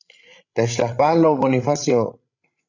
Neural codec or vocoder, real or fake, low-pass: codec, 16 kHz, 16 kbps, FreqCodec, larger model; fake; 7.2 kHz